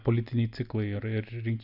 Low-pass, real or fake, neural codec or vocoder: 5.4 kHz; real; none